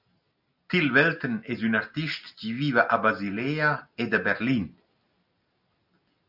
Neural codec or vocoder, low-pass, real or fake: none; 5.4 kHz; real